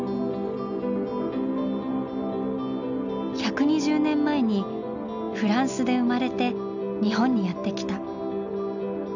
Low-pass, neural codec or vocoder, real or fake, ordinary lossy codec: 7.2 kHz; none; real; none